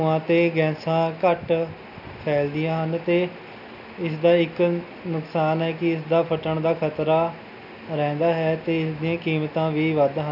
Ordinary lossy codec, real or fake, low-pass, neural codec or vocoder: none; real; 5.4 kHz; none